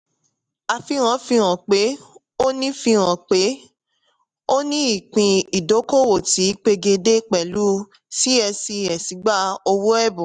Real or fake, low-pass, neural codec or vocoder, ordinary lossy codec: real; 9.9 kHz; none; none